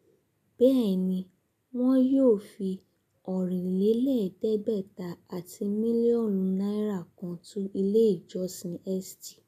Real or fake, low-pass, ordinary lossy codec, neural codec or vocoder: real; 14.4 kHz; none; none